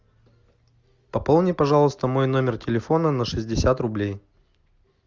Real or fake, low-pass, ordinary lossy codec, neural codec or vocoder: real; 7.2 kHz; Opus, 32 kbps; none